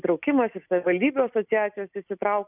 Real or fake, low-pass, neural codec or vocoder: real; 3.6 kHz; none